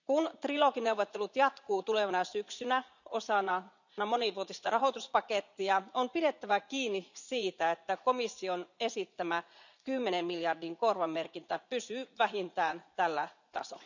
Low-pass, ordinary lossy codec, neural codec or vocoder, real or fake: 7.2 kHz; none; vocoder, 44.1 kHz, 80 mel bands, Vocos; fake